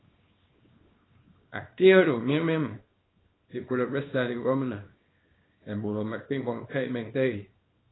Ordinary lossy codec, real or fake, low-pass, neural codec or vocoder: AAC, 16 kbps; fake; 7.2 kHz; codec, 24 kHz, 0.9 kbps, WavTokenizer, small release